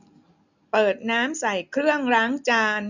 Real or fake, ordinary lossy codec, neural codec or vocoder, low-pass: real; none; none; 7.2 kHz